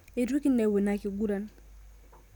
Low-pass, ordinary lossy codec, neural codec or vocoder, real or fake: 19.8 kHz; none; none; real